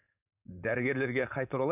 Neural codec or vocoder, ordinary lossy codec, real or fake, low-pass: codec, 16 kHz, 4.8 kbps, FACodec; none; fake; 3.6 kHz